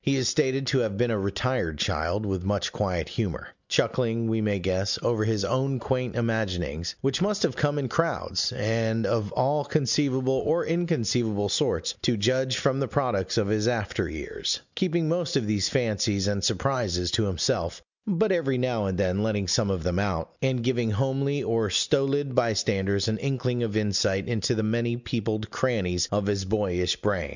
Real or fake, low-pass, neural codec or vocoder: real; 7.2 kHz; none